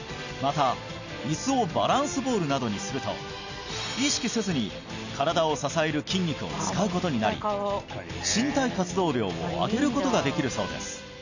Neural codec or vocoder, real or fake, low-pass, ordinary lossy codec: none; real; 7.2 kHz; none